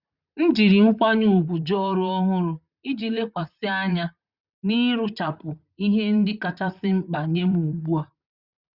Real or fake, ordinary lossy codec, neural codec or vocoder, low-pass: fake; Opus, 64 kbps; vocoder, 44.1 kHz, 128 mel bands, Pupu-Vocoder; 5.4 kHz